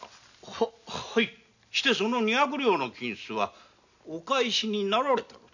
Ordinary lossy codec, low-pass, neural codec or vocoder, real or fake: none; 7.2 kHz; none; real